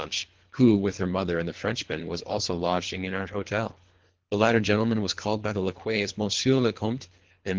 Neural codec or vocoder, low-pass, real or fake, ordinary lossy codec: codec, 24 kHz, 3 kbps, HILCodec; 7.2 kHz; fake; Opus, 16 kbps